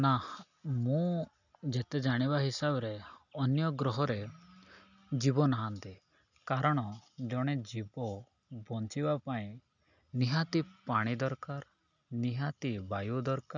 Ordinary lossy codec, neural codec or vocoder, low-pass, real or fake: none; none; 7.2 kHz; real